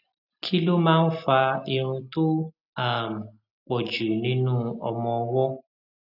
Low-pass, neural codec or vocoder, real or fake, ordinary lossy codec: 5.4 kHz; none; real; none